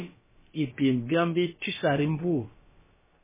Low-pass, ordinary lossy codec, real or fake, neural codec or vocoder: 3.6 kHz; MP3, 16 kbps; fake; codec, 16 kHz, about 1 kbps, DyCAST, with the encoder's durations